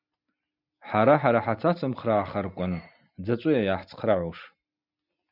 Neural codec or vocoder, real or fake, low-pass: none; real; 5.4 kHz